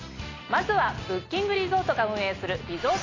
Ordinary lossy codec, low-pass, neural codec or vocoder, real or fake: AAC, 32 kbps; 7.2 kHz; none; real